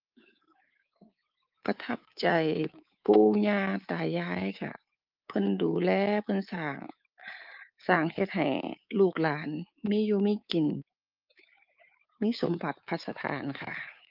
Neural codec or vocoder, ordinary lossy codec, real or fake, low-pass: codec, 24 kHz, 3.1 kbps, DualCodec; Opus, 24 kbps; fake; 5.4 kHz